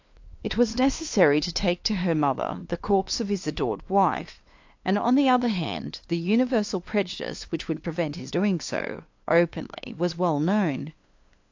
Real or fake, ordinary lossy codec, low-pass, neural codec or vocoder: fake; AAC, 48 kbps; 7.2 kHz; codec, 16 kHz, 2 kbps, FunCodec, trained on LibriTTS, 25 frames a second